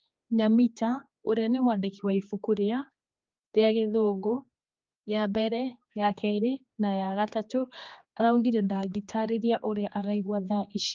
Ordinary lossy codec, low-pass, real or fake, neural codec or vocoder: Opus, 24 kbps; 7.2 kHz; fake; codec, 16 kHz, 2 kbps, X-Codec, HuBERT features, trained on general audio